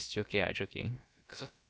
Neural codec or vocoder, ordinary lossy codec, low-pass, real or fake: codec, 16 kHz, about 1 kbps, DyCAST, with the encoder's durations; none; none; fake